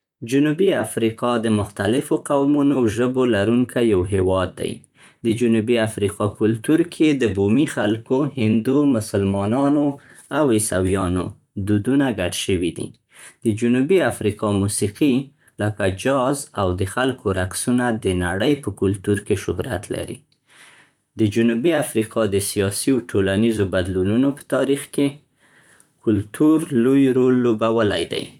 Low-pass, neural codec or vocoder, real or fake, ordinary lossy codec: 19.8 kHz; vocoder, 44.1 kHz, 128 mel bands, Pupu-Vocoder; fake; none